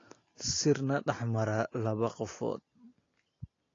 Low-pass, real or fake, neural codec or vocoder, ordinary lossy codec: 7.2 kHz; real; none; AAC, 32 kbps